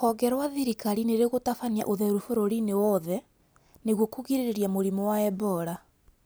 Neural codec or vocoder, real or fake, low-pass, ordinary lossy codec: none; real; none; none